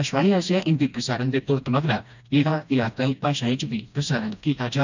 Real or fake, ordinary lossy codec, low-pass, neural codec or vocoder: fake; none; 7.2 kHz; codec, 16 kHz, 1 kbps, FreqCodec, smaller model